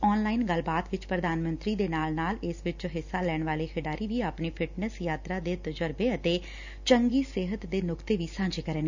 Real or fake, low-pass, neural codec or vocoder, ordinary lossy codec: real; 7.2 kHz; none; none